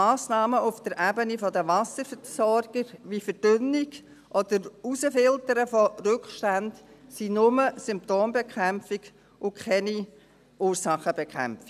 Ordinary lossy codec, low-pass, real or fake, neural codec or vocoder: none; 14.4 kHz; real; none